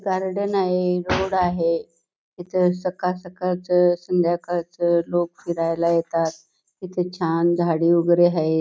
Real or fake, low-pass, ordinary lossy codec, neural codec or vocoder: real; none; none; none